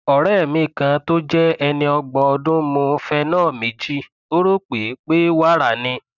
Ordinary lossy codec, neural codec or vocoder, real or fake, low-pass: none; none; real; 7.2 kHz